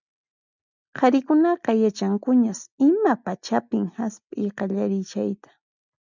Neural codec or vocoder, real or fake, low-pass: none; real; 7.2 kHz